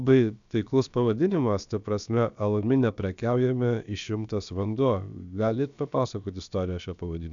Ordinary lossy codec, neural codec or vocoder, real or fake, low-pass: MP3, 96 kbps; codec, 16 kHz, about 1 kbps, DyCAST, with the encoder's durations; fake; 7.2 kHz